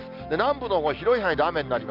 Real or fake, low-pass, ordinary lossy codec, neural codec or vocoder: real; 5.4 kHz; Opus, 24 kbps; none